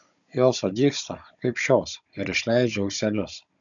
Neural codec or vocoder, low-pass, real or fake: codec, 16 kHz, 16 kbps, FunCodec, trained on Chinese and English, 50 frames a second; 7.2 kHz; fake